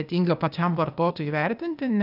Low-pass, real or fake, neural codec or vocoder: 5.4 kHz; fake; codec, 16 kHz, 0.8 kbps, ZipCodec